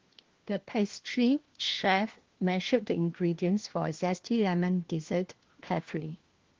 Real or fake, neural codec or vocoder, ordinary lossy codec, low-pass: fake; codec, 16 kHz, 1 kbps, FunCodec, trained on LibriTTS, 50 frames a second; Opus, 16 kbps; 7.2 kHz